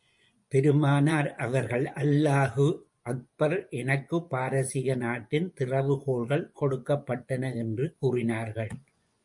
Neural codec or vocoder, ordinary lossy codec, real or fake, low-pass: vocoder, 24 kHz, 100 mel bands, Vocos; MP3, 64 kbps; fake; 10.8 kHz